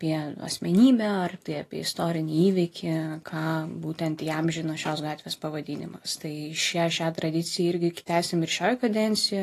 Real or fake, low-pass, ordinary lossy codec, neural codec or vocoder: real; 14.4 kHz; AAC, 48 kbps; none